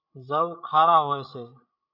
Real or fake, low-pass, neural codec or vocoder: fake; 5.4 kHz; codec, 16 kHz, 16 kbps, FreqCodec, larger model